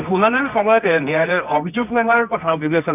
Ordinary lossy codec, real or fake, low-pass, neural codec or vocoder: none; fake; 3.6 kHz; codec, 24 kHz, 0.9 kbps, WavTokenizer, medium music audio release